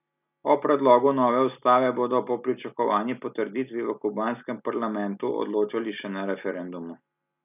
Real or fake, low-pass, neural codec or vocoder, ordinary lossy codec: real; 3.6 kHz; none; none